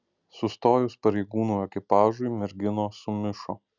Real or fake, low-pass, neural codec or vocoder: real; 7.2 kHz; none